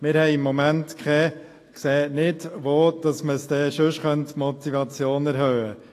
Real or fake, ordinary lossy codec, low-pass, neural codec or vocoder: fake; AAC, 48 kbps; 14.4 kHz; autoencoder, 48 kHz, 128 numbers a frame, DAC-VAE, trained on Japanese speech